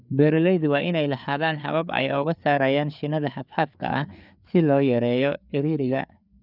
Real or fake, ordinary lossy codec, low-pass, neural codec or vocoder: fake; none; 5.4 kHz; codec, 16 kHz, 4 kbps, FreqCodec, larger model